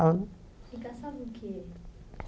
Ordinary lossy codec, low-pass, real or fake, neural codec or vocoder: none; none; real; none